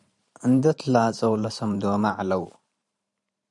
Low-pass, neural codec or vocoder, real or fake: 10.8 kHz; vocoder, 44.1 kHz, 128 mel bands every 512 samples, BigVGAN v2; fake